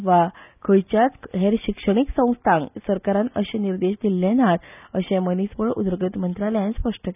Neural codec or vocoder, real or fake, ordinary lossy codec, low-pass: none; real; none; 3.6 kHz